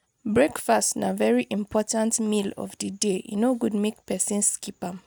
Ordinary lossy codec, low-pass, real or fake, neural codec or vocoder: none; none; real; none